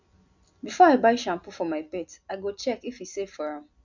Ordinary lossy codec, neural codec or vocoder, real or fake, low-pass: none; none; real; 7.2 kHz